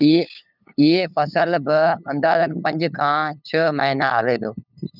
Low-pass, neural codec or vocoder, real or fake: 5.4 kHz; codec, 16 kHz, 8 kbps, FunCodec, trained on LibriTTS, 25 frames a second; fake